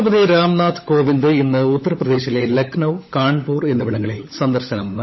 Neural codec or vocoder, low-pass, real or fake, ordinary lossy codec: codec, 16 kHz, 16 kbps, FunCodec, trained on LibriTTS, 50 frames a second; 7.2 kHz; fake; MP3, 24 kbps